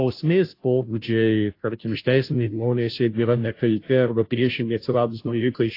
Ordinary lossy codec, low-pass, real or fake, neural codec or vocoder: AAC, 32 kbps; 5.4 kHz; fake; codec, 16 kHz, 0.5 kbps, FunCodec, trained on Chinese and English, 25 frames a second